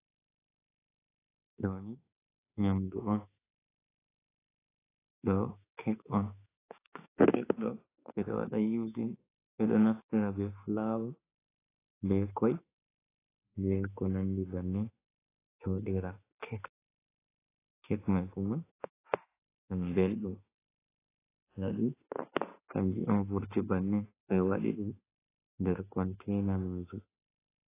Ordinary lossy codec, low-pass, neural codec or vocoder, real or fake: AAC, 16 kbps; 3.6 kHz; autoencoder, 48 kHz, 32 numbers a frame, DAC-VAE, trained on Japanese speech; fake